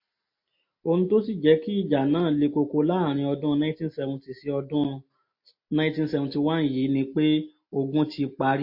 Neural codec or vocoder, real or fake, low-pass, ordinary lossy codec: none; real; 5.4 kHz; MP3, 32 kbps